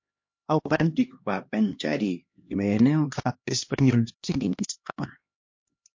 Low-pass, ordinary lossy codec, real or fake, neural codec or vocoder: 7.2 kHz; MP3, 48 kbps; fake; codec, 16 kHz, 1 kbps, X-Codec, HuBERT features, trained on LibriSpeech